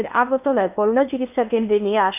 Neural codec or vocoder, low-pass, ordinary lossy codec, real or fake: codec, 16 kHz in and 24 kHz out, 0.8 kbps, FocalCodec, streaming, 65536 codes; 3.6 kHz; none; fake